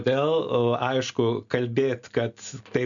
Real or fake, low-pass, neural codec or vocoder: real; 7.2 kHz; none